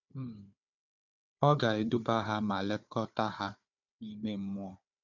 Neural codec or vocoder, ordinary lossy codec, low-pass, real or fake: codec, 16 kHz, 4 kbps, FunCodec, trained on Chinese and English, 50 frames a second; none; 7.2 kHz; fake